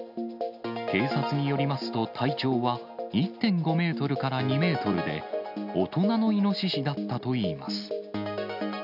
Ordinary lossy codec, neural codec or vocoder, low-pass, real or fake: none; none; 5.4 kHz; real